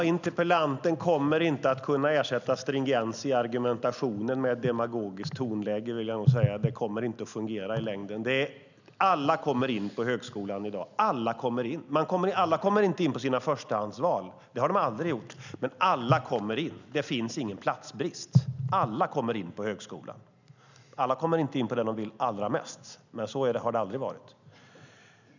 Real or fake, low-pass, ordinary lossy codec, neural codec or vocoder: real; 7.2 kHz; none; none